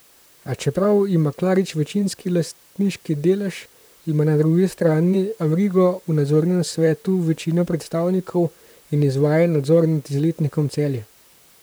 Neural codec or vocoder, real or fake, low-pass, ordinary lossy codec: vocoder, 44.1 kHz, 128 mel bands, Pupu-Vocoder; fake; none; none